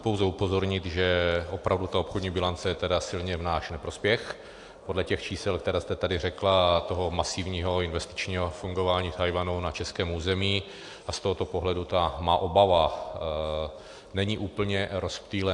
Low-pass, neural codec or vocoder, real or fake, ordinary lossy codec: 10.8 kHz; none; real; AAC, 64 kbps